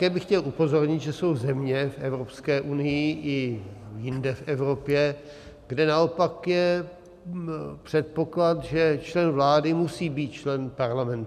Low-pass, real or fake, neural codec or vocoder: 14.4 kHz; real; none